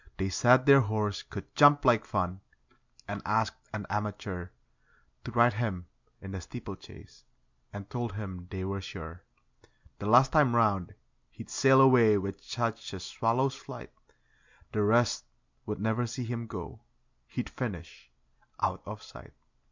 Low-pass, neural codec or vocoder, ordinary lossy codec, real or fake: 7.2 kHz; none; MP3, 64 kbps; real